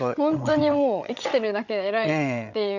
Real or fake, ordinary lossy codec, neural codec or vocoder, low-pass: fake; none; codec, 16 kHz, 8 kbps, FreqCodec, larger model; 7.2 kHz